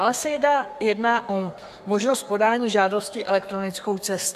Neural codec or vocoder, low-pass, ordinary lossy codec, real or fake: codec, 32 kHz, 1.9 kbps, SNAC; 14.4 kHz; AAC, 96 kbps; fake